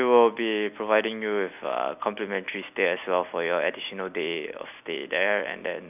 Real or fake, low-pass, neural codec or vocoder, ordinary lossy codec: real; 3.6 kHz; none; none